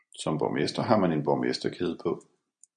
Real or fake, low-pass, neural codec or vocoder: real; 9.9 kHz; none